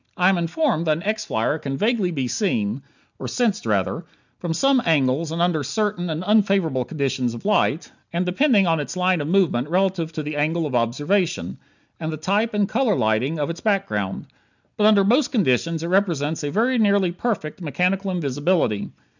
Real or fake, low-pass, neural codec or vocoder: real; 7.2 kHz; none